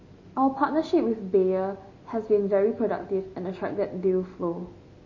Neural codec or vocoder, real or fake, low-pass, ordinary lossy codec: none; real; 7.2 kHz; MP3, 32 kbps